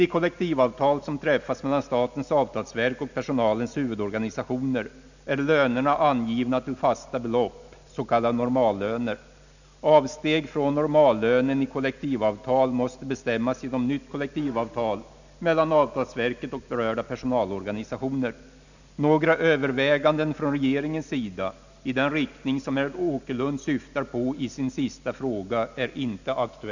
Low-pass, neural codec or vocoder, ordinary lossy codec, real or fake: 7.2 kHz; none; none; real